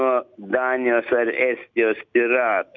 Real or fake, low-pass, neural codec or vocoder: real; 7.2 kHz; none